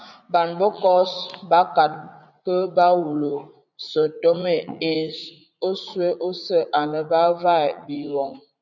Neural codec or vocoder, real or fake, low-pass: vocoder, 44.1 kHz, 80 mel bands, Vocos; fake; 7.2 kHz